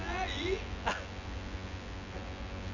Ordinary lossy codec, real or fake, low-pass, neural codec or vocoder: none; fake; 7.2 kHz; vocoder, 24 kHz, 100 mel bands, Vocos